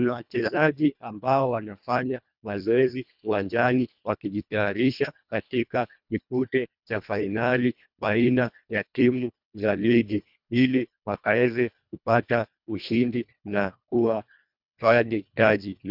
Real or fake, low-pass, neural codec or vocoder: fake; 5.4 kHz; codec, 24 kHz, 1.5 kbps, HILCodec